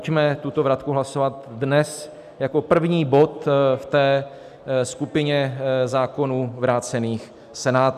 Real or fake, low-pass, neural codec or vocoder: real; 14.4 kHz; none